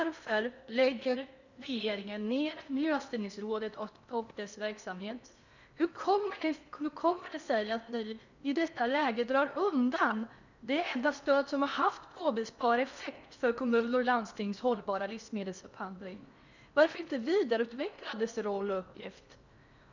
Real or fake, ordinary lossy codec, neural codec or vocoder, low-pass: fake; none; codec, 16 kHz in and 24 kHz out, 0.8 kbps, FocalCodec, streaming, 65536 codes; 7.2 kHz